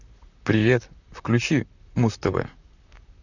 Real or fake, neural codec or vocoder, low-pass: fake; vocoder, 44.1 kHz, 128 mel bands, Pupu-Vocoder; 7.2 kHz